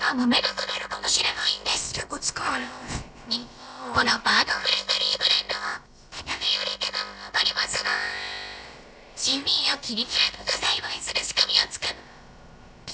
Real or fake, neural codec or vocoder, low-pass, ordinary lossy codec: fake; codec, 16 kHz, about 1 kbps, DyCAST, with the encoder's durations; none; none